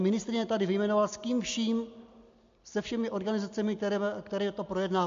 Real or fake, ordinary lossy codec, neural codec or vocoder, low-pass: real; MP3, 48 kbps; none; 7.2 kHz